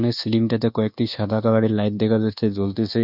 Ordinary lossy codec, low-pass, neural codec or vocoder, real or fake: none; 5.4 kHz; codec, 24 kHz, 1.2 kbps, DualCodec; fake